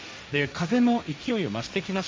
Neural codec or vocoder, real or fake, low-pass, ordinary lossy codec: codec, 16 kHz, 1.1 kbps, Voila-Tokenizer; fake; none; none